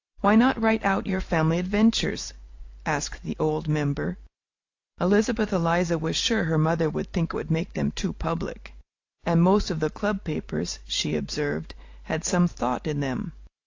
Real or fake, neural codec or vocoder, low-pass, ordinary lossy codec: real; none; 7.2 kHz; AAC, 48 kbps